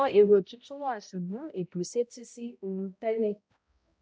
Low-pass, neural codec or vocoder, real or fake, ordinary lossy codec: none; codec, 16 kHz, 0.5 kbps, X-Codec, HuBERT features, trained on balanced general audio; fake; none